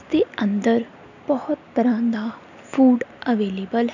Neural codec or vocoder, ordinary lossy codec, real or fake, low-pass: none; none; real; 7.2 kHz